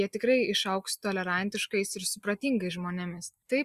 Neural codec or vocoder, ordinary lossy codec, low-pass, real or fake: none; Opus, 64 kbps; 14.4 kHz; real